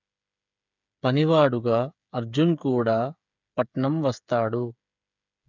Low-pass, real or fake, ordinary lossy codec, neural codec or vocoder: 7.2 kHz; fake; none; codec, 16 kHz, 8 kbps, FreqCodec, smaller model